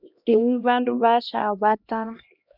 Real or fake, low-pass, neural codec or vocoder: fake; 5.4 kHz; codec, 16 kHz, 1 kbps, X-Codec, HuBERT features, trained on LibriSpeech